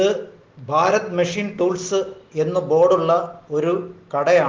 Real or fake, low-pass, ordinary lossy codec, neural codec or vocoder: real; 7.2 kHz; Opus, 16 kbps; none